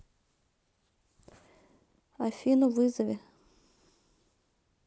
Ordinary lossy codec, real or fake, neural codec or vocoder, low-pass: none; real; none; none